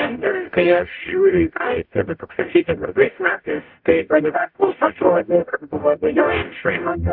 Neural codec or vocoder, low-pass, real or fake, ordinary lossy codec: codec, 44.1 kHz, 0.9 kbps, DAC; 5.4 kHz; fake; AAC, 48 kbps